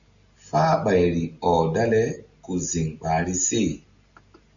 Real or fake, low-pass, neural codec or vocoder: real; 7.2 kHz; none